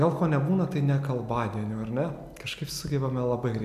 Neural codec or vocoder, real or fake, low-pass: none; real; 14.4 kHz